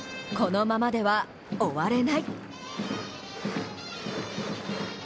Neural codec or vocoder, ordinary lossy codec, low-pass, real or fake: none; none; none; real